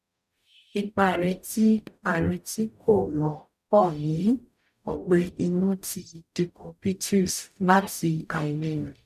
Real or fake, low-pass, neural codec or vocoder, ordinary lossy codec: fake; 14.4 kHz; codec, 44.1 kHz, 0.9 kbps, DAC; none